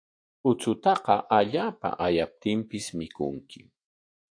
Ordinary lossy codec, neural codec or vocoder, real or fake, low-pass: AAC, 48 kbps; autoencoder, 48 kHz, 128 numbers a frame, DAC-VAE, trained on Japanese speech; fake; 9.9 kHz